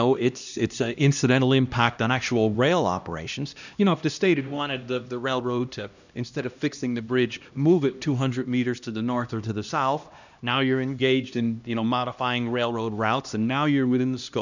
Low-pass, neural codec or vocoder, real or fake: 7.2 kHz; codec, 16 kHz, 1 kbps, X-Codec, HuBERT features, trained on LibriSpeech; fake